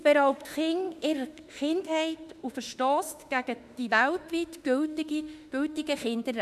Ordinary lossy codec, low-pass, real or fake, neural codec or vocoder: none; 14.4 kHz; fake; autoencoder, 48 kHz, 32 numbers a frame, DAC-VAE, trained on Japanese speech